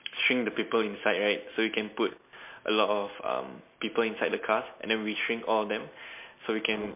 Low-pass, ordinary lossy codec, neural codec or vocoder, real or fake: 3.6 kHz; MP3, 24 kbps; none; real